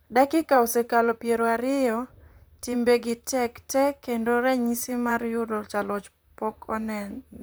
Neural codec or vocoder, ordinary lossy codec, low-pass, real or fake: vocoder, 44.1 kHz, 128 mel bands, Pupu-Vocoder; none; none; fake